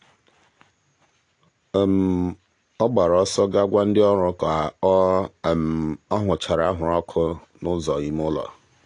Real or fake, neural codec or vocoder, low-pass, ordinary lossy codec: real; none; 9.9 kHz; AAC, 48 kbps